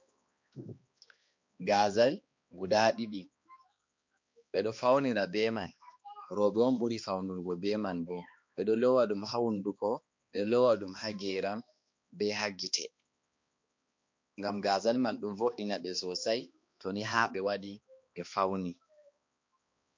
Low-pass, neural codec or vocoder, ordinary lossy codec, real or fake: 7.2 kHz; codec, 16 kHz, 2 kbps, X-Codec, HuBERT features, trained on balanced general audio; MP3, 48 kbps; fake